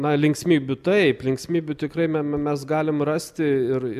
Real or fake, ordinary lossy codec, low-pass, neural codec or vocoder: real; MP3, 96 kbps; 14.4 kHz; none